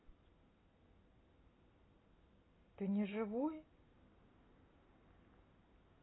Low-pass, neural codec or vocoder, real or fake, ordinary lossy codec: 7.2 kHz; none; real; AAC, 16 kbps